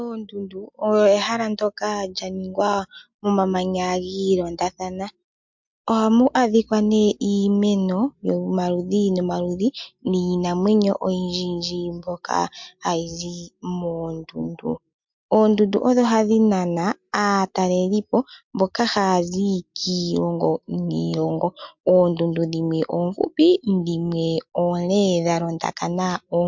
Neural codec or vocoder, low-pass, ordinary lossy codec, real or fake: none; 7.2 kHz; MP3, 64 kbps; real